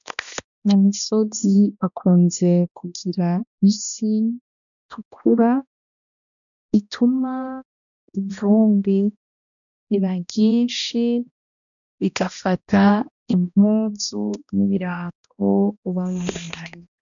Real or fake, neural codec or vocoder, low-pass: fake; codec, 16 kHz, 1 kbps, X-Codec, HuBERT features, trained on balanced general audio; 7.2 kHz